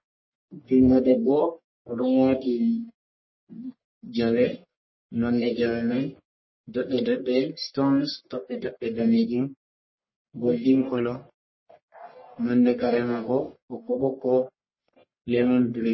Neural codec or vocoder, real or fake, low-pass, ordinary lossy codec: codec, 44.1 kHz, 1.7 kbps, Pupu-Codec; fake; 7.2 kHz; MP3, 24 kbps